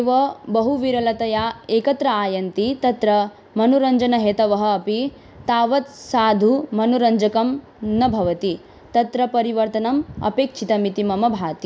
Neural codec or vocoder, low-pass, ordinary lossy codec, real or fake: none; none; none; real